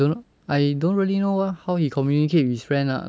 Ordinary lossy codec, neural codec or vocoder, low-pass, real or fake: none; none; none; real